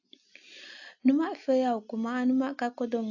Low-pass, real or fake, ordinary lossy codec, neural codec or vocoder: 7.2 kHz; real; AAC, 48 kbps; none